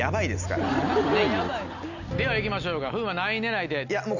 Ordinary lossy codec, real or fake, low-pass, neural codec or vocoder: none; real; 7.2 kHz; none